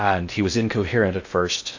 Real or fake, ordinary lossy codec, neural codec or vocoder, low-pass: fake; AAC, 48 kbps; codec, 16 kHz in and 24 kHz out, 0.6 kbps, FocalCodec, streaming, 4096 codes; 7.2 kHz